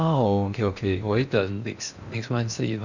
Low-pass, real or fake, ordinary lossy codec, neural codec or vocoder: 7.2 kHz; fake; none; codec, 16 kHz in and 24 kHz out, 0.6 kbps, FocalCodec, streaming, 4096 codes